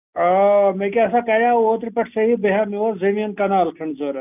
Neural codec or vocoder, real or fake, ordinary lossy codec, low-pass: none; real; none; 3.6 kHz